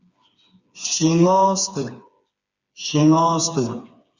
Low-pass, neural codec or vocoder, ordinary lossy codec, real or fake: 7.2 kHz; codec, 16 kHz, 4 kbps, FreqCodec, smaller model; Opus, 64 kbps; fake